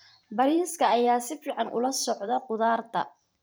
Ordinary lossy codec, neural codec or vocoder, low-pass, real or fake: none; vocoder, 44.1 kHz, 128 mel bands every 512 samples, BigVGAN v2; none; fake